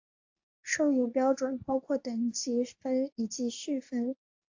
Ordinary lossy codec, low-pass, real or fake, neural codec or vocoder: AAC, 48 kbps; 7.2 kHz; fake; codec, 24 kHz, 0.9 kbps, WavTokenizer, medium speech release version 2